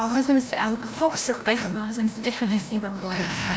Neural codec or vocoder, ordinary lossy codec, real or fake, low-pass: codec, 16 kHz, 0.5 kbps, FreqCodec, larger model; none; fake; none